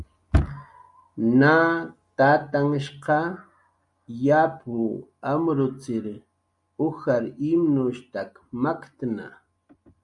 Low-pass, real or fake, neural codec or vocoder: 10.8 kHz; real; none